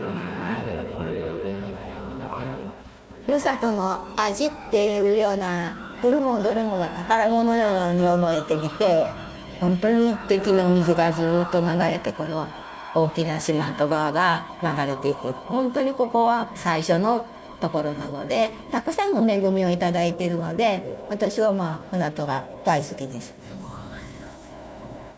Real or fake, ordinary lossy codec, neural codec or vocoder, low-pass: fake; none; codec, 16 kHz, 1 kbps, FunCodec, trained on Chinese and English, 50 frames a second; none